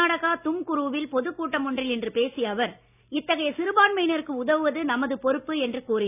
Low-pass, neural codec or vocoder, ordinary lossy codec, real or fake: 3.6 kHz; none; none; real